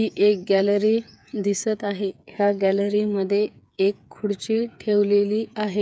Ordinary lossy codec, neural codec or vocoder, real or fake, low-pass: none; codec, 16 kHz, 8 kbps, FreqCodec, smaller model; fake; none